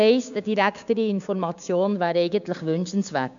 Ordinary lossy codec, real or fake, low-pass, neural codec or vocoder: none; fake; 7.2 kHz; codec, 16 kHz, 6 kbps, DAC